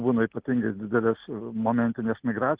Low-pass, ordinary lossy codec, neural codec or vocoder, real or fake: 3.6 kHz; Opus, 16 kbps; none; real